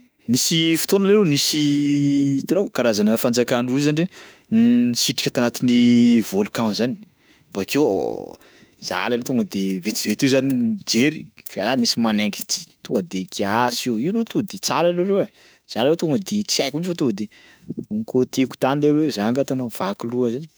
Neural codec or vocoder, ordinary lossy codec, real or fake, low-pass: autoencoder, 48 kHz, 32 numbers a frame, DAC-VAE, trained on Japanese speech; none; fake; none